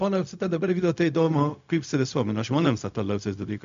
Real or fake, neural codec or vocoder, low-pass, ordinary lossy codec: fake; codec, 16 kHz, 0.4 kbps, LongCat-Audio-Codec; 7.2 kHz; MP3, 64 kbps